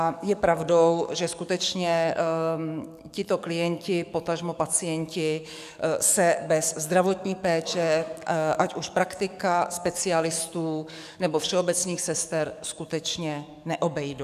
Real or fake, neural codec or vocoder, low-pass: fake; codec, 44.1 kHz, 7.8 kbps, DAC; 14.4 kHz